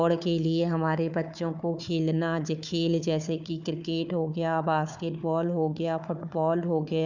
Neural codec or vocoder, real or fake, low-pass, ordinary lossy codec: codec, 16 kHz, 4 kbps, FunCodec, trained on Chinese and English, 50 frames a second; fake; 7.2 kHz; none